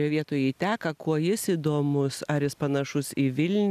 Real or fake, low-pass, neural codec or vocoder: real; 14.4 kHz; none